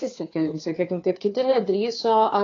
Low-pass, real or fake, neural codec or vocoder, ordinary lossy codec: 7.2 kHz; fake; codec, 16 kHz, 2 kbps, FunCodec, trained on Chinese and English, 25 frames a second; AAC, 32 kbps